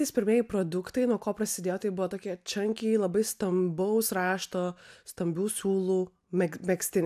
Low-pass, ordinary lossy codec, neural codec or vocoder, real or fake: 14.4 kHz; AAC, 96 kbps; none; real